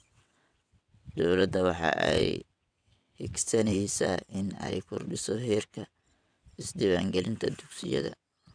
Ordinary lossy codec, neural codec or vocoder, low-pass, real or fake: none; vocoder, 22.05 kHz, 80 mel bands, WaveNeXt; 9.9 kHz; fake